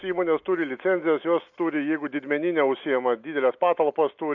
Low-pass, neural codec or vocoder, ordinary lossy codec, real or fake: 7.2 kHz; none; MP3, 64 kbps; real